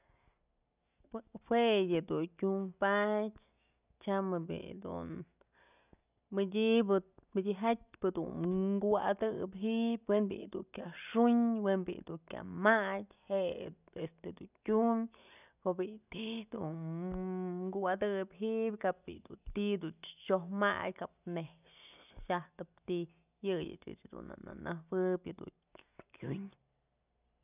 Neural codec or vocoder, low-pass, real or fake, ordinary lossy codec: none; 3.6 kHz; real; none